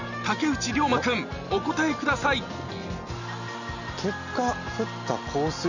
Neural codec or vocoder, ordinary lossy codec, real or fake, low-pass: none; none; real; 7.2 kHz